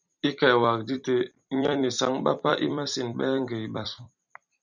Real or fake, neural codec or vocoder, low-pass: fake; vocoder, 44.1 kHz, 128 mel bands every 256 samples, BigVGAN v2; 7.2 kHz